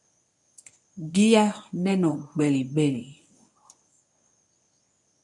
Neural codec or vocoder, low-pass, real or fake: codec, 24 kHz, 0.9 kbps, WavTokenizer, medium speech release version 1; 10.8 kHz; fake